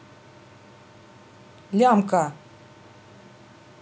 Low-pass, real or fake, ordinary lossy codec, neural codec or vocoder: none; real; none; none